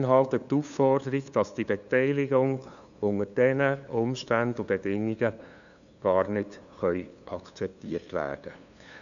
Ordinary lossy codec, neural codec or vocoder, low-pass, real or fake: none; codec, 16 kHz, 2 kbps, FunCodec, trained on LibriTTS, 25 frames a second; 7.2 kHz; fake